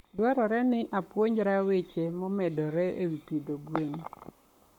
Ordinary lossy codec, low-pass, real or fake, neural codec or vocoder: none; 19.8 kHz; fake; codec, 44.1 kHz, 7.8 kbps, Pupu-Codec